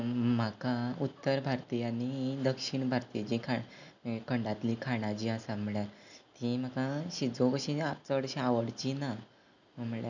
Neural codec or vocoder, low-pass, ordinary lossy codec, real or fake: none; 7.2 kHz; none; real